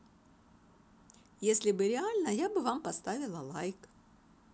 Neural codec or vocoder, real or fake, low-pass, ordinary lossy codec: none; real; none; none